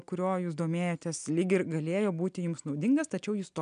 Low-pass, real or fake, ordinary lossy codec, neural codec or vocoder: 9.9 kHz; real; MP3, 96 kbps; none